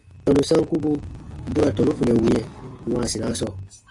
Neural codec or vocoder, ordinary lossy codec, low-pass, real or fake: none; AAC, 48 kbps; 10.8 kHz; real